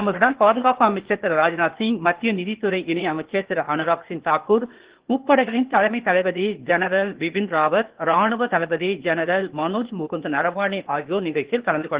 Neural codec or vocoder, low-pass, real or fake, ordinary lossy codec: codec, 16 kHz, 0.8 kbps, ZipCodec; 3.6 kHz; fake; Opus, 16 kbps